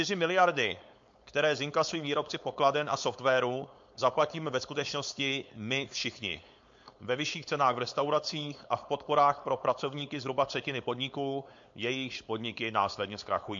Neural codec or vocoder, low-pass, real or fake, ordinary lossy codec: codec, 16 kHz, 4.8 kbps, FACodec; 7.2 kHz; fake; MP3, 48 kbps